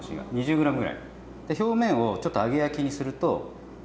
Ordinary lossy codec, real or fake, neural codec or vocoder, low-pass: none; real; none; none